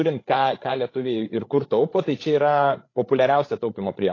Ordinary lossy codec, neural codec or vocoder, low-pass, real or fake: AAC, 32 kbps; codec, 16 kHz, 16 kbps, FreqCodec, larger model; 7.2 kHz; fake